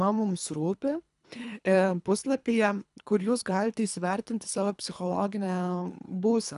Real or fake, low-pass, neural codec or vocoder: fake; 10.8 kHz; codec, 24 kHz, 3 kbps, HILCodec